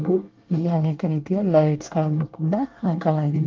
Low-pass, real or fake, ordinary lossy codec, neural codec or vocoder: 7.2 kHz; fake; Opus, 32 kbps; codec, 24 kHz, 1 kbps, SNAC